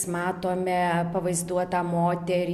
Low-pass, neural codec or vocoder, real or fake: 14.4 kHz; none; real